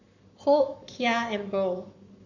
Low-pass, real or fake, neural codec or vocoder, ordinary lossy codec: 7.2 kHz; fake; codec, 44.1 kHz, 7.8 kbps, Pupu-Codec; none